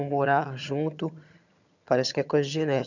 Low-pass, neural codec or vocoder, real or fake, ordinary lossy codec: 7.2 kHz; vocoder, 22.05 kHz, 80 mel bands, HiFi-GAN; fake; none